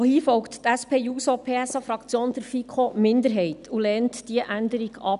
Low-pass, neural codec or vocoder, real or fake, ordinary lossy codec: 10.8 kHz; none; real; none